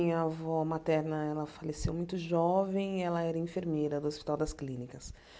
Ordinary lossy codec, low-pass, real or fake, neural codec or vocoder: none; none; real; none